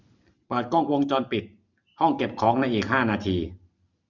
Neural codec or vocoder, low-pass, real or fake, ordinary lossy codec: none; 7.2 kHz; real; none